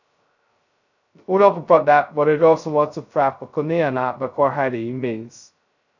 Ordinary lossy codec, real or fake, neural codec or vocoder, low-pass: none; fake; codec, 16 kHz, 0.2 kbps, FocalCodec; 7.2 kHz